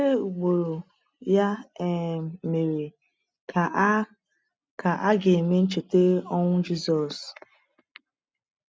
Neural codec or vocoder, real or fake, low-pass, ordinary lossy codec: none; real; none; none